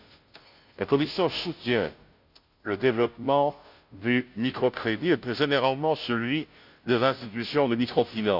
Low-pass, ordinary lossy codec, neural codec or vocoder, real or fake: 5.4 kHz; none; codec, 16 kHz, 0.5 kbps, FunCodec, trained on Chinese and English, 25 frames a second; fake